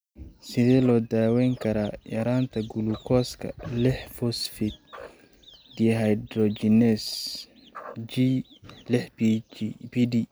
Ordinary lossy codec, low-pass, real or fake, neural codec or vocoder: none; none; real; none